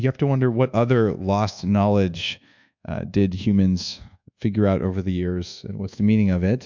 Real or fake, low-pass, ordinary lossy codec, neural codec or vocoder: fake; 7.2 kHz; MP3, 64 kbps; codec, 24 kHz, 1.2 kbps, DualCodec